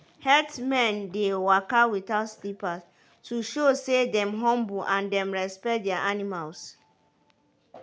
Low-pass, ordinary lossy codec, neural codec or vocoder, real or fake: none; none; none; real